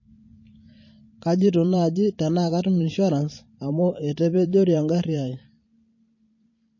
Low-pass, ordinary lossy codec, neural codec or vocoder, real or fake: 7.2 kHz; MP3, 32 kbps; vocoder, 44.1 kHz, 128 mel bands every 512 samples, BigVGAN v2; fake